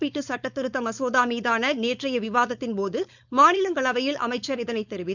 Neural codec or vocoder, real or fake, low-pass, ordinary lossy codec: codec, 16 kHz, 4.8 kbps, FACodec; fake; 7.2 kHz; none